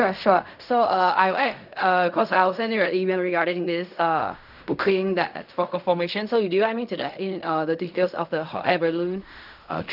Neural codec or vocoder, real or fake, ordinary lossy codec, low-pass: codec, 16 kHz in and 24 kHz out, 0.4 kbps, LongCat-Audio-Codec, fine tuned four codebook decoder; fake; none; 5.4 kHz